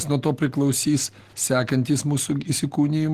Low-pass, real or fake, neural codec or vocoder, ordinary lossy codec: 14.4 kHz; real; none; Opus, 16 kbps